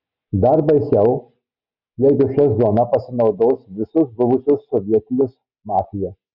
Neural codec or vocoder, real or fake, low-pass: none; real; 5.4 kHz